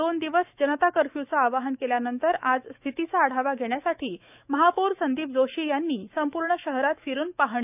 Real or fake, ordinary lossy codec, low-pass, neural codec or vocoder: fake; none; 3.6 kHz; autoencoder, 48 kHz, 128 numbers a frame, DAC-VAE, trained on Japanese speech